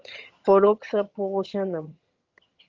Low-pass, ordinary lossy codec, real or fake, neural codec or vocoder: 7.2 kHz; Opus, 32 kbps; fake; vocoder, 22.05 kHz, 80 mel bands, HiFi-GAN